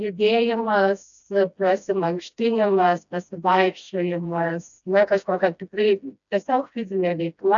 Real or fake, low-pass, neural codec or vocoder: fake; 7.2 kHz; codec, 16 kHz, 1 kbps, FreqCodec, smaller model